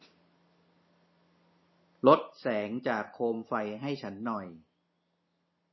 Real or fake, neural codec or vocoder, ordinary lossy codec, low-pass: real; none; MP3, 24 kbps; 7.2 kHz